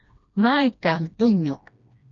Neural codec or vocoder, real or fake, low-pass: codec, 16 kHz, 1 kbps, FreqCodec, smaller model; fake; 7.2 kHz